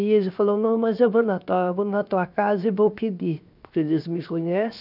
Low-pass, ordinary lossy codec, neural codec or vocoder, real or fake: 5.4 kHz; none; codec, 16 kHz, 0.7 kbps, FocalCodec; fake